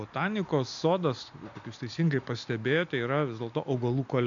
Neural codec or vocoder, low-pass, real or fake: none; 7.2 kHz; real